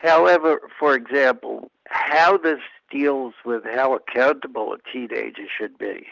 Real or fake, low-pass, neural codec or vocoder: real; 7.2 kHz; none